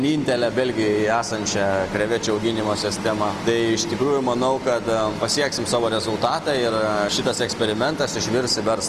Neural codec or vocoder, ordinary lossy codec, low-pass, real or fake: none; Opus, 24 kbps; 14.4 kHz; real